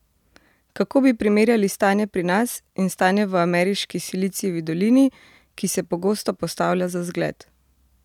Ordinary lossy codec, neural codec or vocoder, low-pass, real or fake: none; none; 19.8 kHz; real